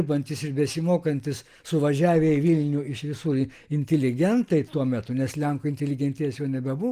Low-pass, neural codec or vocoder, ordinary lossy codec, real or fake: 14.4 kHz; none; Opus, 24 kbps; real